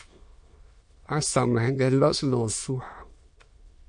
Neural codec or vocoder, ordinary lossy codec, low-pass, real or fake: autoencoder, 22.05 kHz, a latent of 192 numbers a frame, VITS, trained on many speakers; MP3, 48 kbps; 9.9 kHz; fake